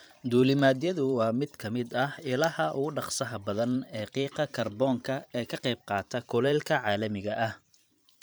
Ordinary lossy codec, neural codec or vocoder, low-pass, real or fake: none; vocoder, 44.1 kHz, 128 mel bands every 512 samples, BigVGAN v2; none; fake